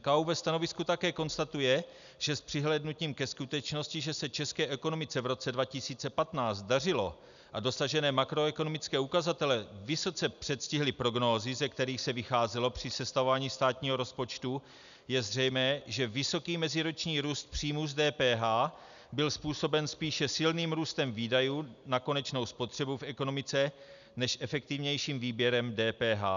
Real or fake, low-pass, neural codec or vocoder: real; 7.2 kHz; none